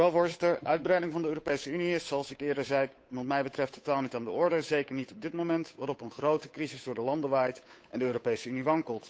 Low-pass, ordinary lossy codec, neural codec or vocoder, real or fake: none; none; codec, 16 kHz, 8 kbps, FunCodec, trained on Chinese and English, 25 frames a second; fake